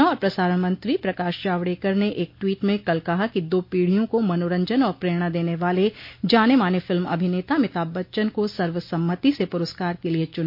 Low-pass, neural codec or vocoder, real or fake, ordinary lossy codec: 5.4 kHz; none; real; MP3, 32 kbps